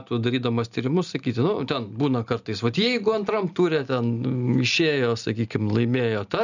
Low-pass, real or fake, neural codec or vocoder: 7.2 kHz; real; none